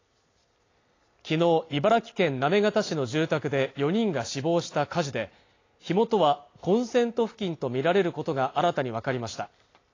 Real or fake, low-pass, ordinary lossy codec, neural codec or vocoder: real; 7.2 kHz; AAC, 32 kbps; none